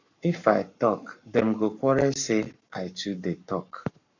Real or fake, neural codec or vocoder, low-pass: fake; codec, 44.1 kHz, 7.8 kbps, Pupu-Codec; 7.2 kHz